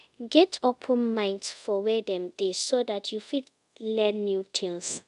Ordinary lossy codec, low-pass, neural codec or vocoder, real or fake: none; 10.8 kHz; codec, 24 kHz, 0.5 kbps, DualCodec; fake